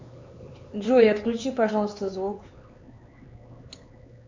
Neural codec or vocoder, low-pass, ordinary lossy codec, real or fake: codec, 16 kHz, 4 kbps, X-Codec, HuBERT features, trained on LibriSpeech; 7.2 kHz; MP3, 64 kbps; fake